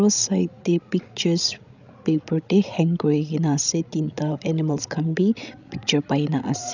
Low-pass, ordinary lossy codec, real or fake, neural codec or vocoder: 7.2 kHz; none; fake; codec, 16 kHz, 16 kbps, FreqCodec, larger model